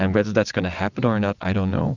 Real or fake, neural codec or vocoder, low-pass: fake; vocoder, 22.05 kHz, 80 mel bands, WaveNeXt; 7.2 kHz